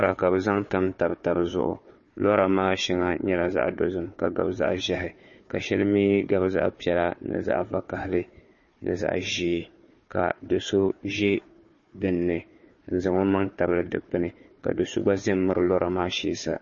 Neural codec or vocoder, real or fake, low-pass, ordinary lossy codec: codec, 16 kHz, 4 kbps, FunCodec, trained on Chinese and English, 50 frames a second; fake; 7.2 kHz; MP3, 32 kbps